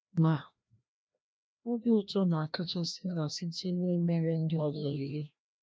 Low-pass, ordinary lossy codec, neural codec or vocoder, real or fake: none; none; codec, 16 kHz, 1 kbps, FreqCodec, larger model; fake